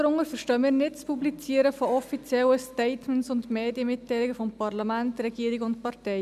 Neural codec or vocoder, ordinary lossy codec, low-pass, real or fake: none; none; 14.4 kHz; real